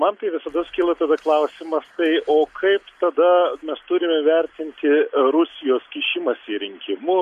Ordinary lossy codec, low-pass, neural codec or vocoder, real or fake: MP3, 64 kbps; 14.4 kHz; none; real